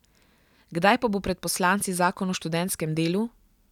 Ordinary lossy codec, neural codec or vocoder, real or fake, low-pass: none; none; real; 19.8 kHz